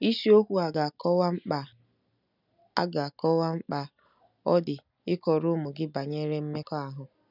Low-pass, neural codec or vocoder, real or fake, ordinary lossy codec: 5.4 kHz; none; real; none